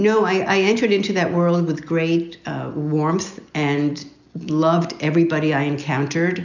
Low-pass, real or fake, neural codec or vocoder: 7.2 kHz; real; none